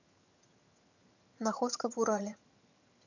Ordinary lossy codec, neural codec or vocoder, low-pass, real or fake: none; vocoder, 22.05 kHz, 80 mel bands, HiFi-GAN; 7.2 kHz; fake